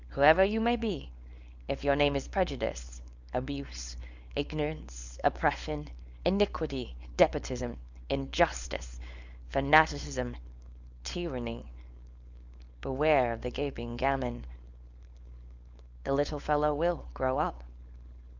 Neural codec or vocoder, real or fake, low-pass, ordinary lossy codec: codec, 16 kHz, 4.8 kbps, FACodec; fake; 7.2 kHz; Opus, 64 kbps